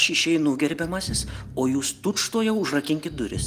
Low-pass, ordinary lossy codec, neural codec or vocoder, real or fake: 14.4 kHz; Opus, 24 kbps; none; real